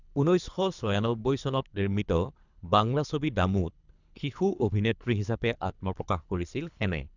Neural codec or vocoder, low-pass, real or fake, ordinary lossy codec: codec, 24 kHz, 3 kbps, HILCodec; 7.2 kHz; fake; none